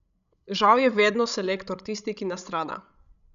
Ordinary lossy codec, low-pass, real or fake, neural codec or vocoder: none; 7.2 kHz; fake; codec, 16 kHz, 16 kbps, FreqCodec, larger model